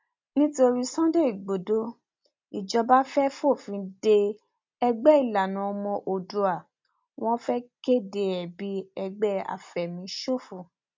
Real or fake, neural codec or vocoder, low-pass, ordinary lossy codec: real; none; 7.2 kHz; MP3, 64 kbps